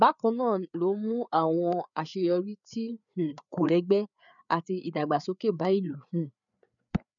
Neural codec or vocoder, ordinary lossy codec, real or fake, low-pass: codec, 16 kHz, 4 kbps, FreqCodec, larger model; none; fake; 7.2 kHz